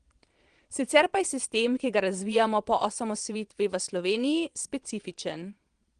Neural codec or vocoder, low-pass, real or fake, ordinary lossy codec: vocoder, 22.05 kHz, 80 mel bands, Vocos; 9.9 kHz; fake; Opus, 24 kbps